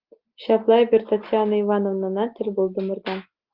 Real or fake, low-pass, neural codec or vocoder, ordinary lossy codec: real; 5.4 kHz; none; Opus, 24 kbps